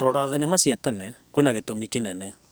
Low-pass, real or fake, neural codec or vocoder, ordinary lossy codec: none; fake; codec, 44.1 kHz, 2.6 kbps, SNAC; none